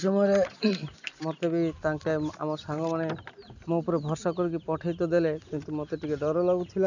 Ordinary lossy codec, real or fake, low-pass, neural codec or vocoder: none; real; 7.2 kHz; none